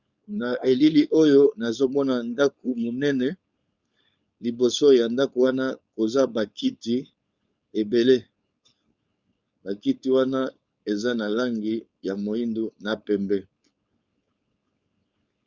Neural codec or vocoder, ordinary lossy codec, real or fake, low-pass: codec, 16 kHz, 4.8 kbps, FACodec; Opus, 64 kbps; fake; 7.2 kHz